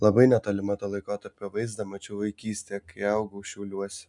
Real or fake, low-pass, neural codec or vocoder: real; 10.8 kHz; none